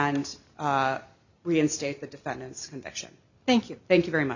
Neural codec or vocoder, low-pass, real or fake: none; 7.2 kHz; real